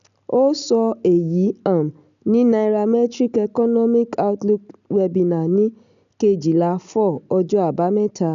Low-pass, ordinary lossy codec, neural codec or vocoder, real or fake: 7.2 kHz; none; none; real